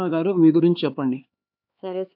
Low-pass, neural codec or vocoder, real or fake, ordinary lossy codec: 5.4 kHz; codec, 16 kHz, 4 kbps, X-Codec, HuBERT features, trained on LibriSpeech; fake; AAC, 48 kbps